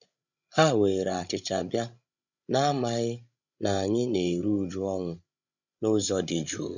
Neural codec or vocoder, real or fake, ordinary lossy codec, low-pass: codec, 16 kHz, 16 kbps, FreqCodec, larger model; fake; none; 7.2 kHz